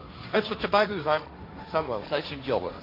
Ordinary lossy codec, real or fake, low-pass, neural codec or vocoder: AAC, 32 kbps; fake; 5.4 kHz; codec, 16 kHz, 1.1 kbps, Voila-Tokenizer